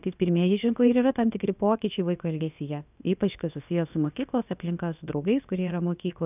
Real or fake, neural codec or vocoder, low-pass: fake; codec, 16 kHz, about 1 kbps, DyCAST, with the encoder's durations; 3.6 kHz